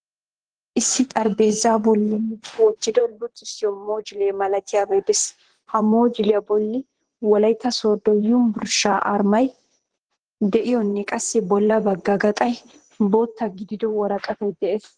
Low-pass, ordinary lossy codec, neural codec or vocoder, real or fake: 9.9 kHz; Opus, 16 kbps; vocoder, 44.1 kHz, 128 mel bands, Pupu-Vocoder; fake